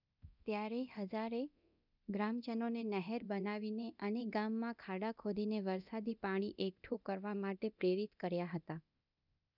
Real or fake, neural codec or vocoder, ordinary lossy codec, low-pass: fake; codec, 24 kHz, 0.9 kbps, DualCodec; none; 5.4 kHz